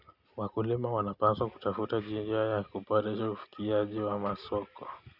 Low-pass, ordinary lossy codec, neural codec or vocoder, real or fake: 5.4 kHz; none; none; real